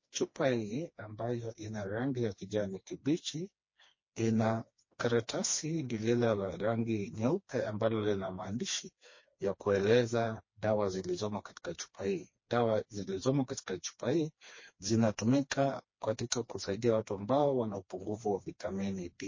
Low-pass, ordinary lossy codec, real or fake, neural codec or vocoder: 7.2 kHz; MP3, 32 kbps; fake; codec, 16 kHz, 2 kbps, FreqCodec, smaller model